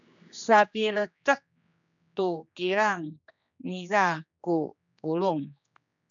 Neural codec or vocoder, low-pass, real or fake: codec, 16 kHz, 2 kbps, X-Codec, HuBERT features, trained on general audio; 7.2 kHz; fake